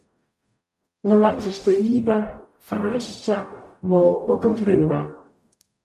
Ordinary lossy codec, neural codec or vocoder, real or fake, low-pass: MP3, 96 kbps; codec, 44.1 kHz, 0.9 kbps, DAC; fake; 14.4 kHz